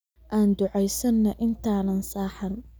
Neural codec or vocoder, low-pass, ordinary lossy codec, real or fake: none; none; none; real